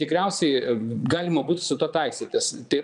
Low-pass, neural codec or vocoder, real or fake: 9.9 kHz; vocoder, 22.05 kHz, 80 mel bands, Vocos; fake